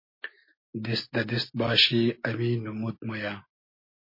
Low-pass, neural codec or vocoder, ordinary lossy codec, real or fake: 5.4 kHz; none; MP3, 24 kbps; real